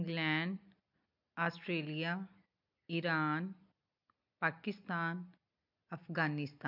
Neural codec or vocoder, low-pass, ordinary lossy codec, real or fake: none; 5.4 kHz; MP3, 48 kbps; real